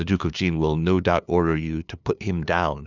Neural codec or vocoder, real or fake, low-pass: codec, 16 kHz, 2 kbps, FunCodec, trained on LibriTTS, 25 frames a second; fake; 7.2 kHz